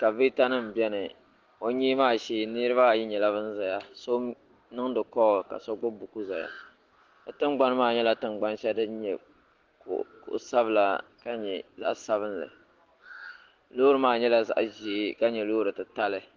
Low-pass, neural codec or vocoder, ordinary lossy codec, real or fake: 7.2 kHz; none; Opus, 16 kbps; real